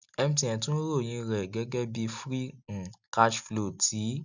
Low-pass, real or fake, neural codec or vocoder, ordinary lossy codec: 7.2 kHz; real; none; none